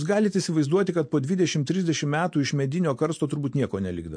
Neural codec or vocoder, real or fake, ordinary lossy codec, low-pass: none; real; MP3, 48 kbps; 9.9 kHz